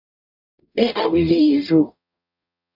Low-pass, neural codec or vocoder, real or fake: 5.4 kHz; codec, 44.1 kHz, 0.9 kbps, DAC; fake